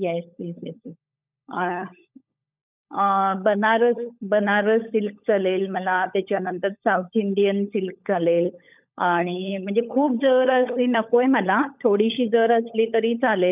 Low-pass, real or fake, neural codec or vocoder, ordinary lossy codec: 3.6 kHz; fake; codec, 16 kHz, 16 kbps, FunCodec, trained on LibriTTS, 50 frames a second; none